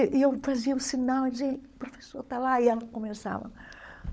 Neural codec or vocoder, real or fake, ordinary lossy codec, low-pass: codec, 16 kHz, 16 kbps, FunCodec, trained on LibriTTS, 50 frames a second; fake; none; none